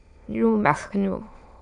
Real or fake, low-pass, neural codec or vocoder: fake; 9.9 kHz; autoencoder, 22.05 kHz, a latent of 192 numbers a frame, VITS, trained on many speakers